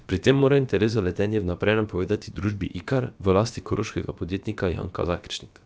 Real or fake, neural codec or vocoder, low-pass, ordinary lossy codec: fake; codec, 16 kHz, about 1 kbps, DyCAST, with the encoder's durations; none; none